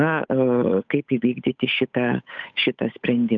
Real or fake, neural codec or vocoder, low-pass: fake; codec, 16 kHz, 8 kbps, FunCodec, trained on Chinese and English, 25 frames a second; 7.2 kHz